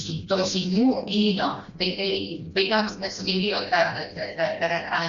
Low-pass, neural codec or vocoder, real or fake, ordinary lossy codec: 7.2 kHz; codec, 16 kHz, 1 kbps, FreqCodec, smaller model; fake; Opus, 64 kbps